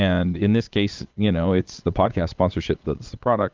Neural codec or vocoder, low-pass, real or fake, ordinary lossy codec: vocoder, 22.05 kHz, 80 mel bands, Vocos; 7.2 kHz; fake; Opus, 24 kbps